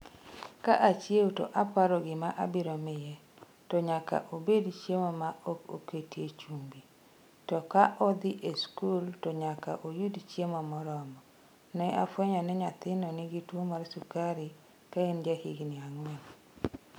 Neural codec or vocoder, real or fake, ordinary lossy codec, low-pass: none; real; none; none